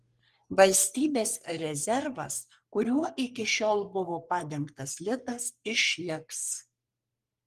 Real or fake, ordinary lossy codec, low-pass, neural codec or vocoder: fake; Opus, 24 kbps; 14.4 kHz; codec, 44.1 kHz, 3.4 kbps, Pupu-Codec